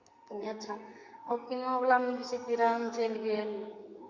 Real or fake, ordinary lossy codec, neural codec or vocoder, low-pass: fake; Opus, 64 kbps; codec, 44.1 kHz, 2.6 kbps, SNAC; 7.2 kHz